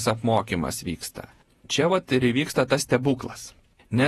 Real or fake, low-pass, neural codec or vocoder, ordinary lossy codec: fake; 19.8 kHz; vocoder, 48 kHz, 128 mel bands, Vocos; AAC, 32 kbps